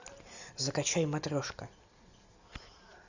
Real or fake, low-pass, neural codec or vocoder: real; 7.2 kHz; none